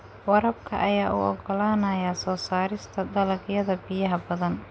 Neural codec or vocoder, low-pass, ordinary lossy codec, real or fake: none; none; none; real